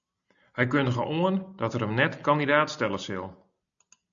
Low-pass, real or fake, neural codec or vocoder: 7.2 kHz; real; none